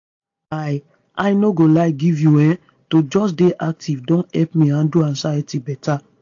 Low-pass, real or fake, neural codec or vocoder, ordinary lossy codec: 7.2 kHz; real; none; AAC, 48 kbps